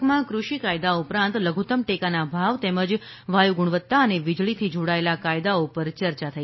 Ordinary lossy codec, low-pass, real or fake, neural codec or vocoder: MP3, 24 kbps; 7.2 kHz; real; none